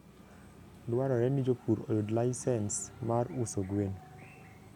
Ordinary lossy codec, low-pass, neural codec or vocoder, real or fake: none; 19.8 kHz; none; real